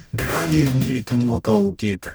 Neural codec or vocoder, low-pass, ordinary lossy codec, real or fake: codec, 44.1 kHz, 0.9 kbps, DAC; none; none; fake